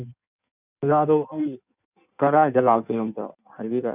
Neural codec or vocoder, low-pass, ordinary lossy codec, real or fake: codec, 16 kHz in and 24 kHz out, 1.1 kbps, FireRedTTS-2 codec; 3.6 kHz; none; fake